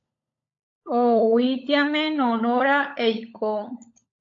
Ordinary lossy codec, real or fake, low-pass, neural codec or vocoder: AAC, 48 kbps; fake; 7.2 kHz; codec, 16 kHz, 16 kbps, FunCodec, trained on LibriTTS, 50 frames a second